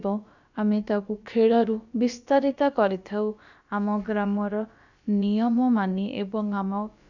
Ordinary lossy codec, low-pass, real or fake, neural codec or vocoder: none; 7.2 kHz; fake; codec, 16 kHz, about 1 kbps, DyCAST, with the encoder's durations